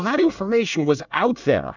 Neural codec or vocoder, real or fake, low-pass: codec, 24 kHz, 1 kbps, SNAC; fake; 7.2 kHz